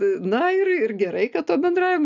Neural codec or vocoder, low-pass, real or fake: none; 7.2 kHz; real